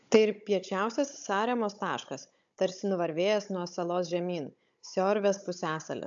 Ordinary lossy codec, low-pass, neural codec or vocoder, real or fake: AAC, 64 kbps; 7.2 kHz; codec, 16 kHz, 16 kbps, FunCodec, trained on Chinese and English, 50 frames a second; fake